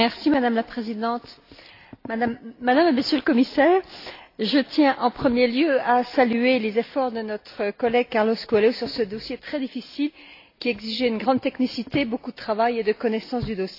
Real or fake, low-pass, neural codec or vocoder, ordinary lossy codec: real; 5.4 kHz; none; AAC, 32 kbps